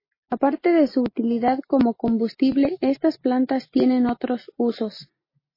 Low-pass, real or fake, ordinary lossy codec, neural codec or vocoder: 5.4 kHz; real; MP3, 24 kbps; none